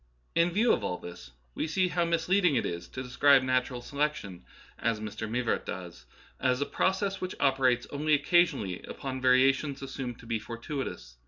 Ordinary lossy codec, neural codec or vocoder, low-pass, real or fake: MP3, 64 kbps; none; 7.2 kHz; real